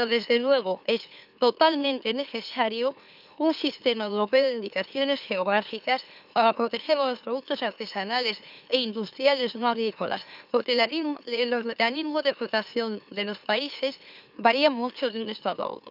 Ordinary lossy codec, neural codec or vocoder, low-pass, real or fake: none; autoencoder, 44.1 kHz, a latent of 192 numbers a frame, MeloTTS; 5.4 kHz; fake